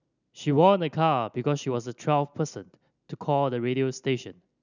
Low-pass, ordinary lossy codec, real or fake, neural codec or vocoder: 7.2 kHz; none; real; none